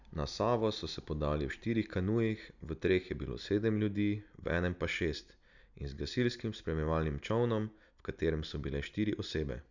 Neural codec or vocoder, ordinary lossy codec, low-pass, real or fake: none; none; 7.2 kHz; real